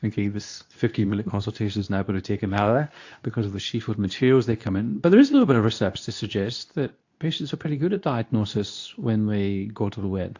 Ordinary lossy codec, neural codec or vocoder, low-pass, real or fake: AAC, 48 kbps; codec, 24 kHz, 0.9 kbps, WavTokenizer, medium speech release version 2; 7.2 kHz; fake